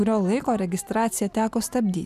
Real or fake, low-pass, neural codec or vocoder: real; 14.4 kHz; none